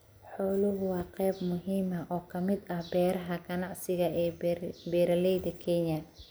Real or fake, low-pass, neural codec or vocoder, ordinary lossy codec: real; none; none; none